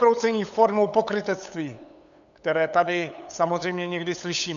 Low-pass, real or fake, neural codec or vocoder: 7.2 kHz; fake; codec, 16 kHz, 8 kbps, FunCodec, trained on LibriTTS, 25 frames a second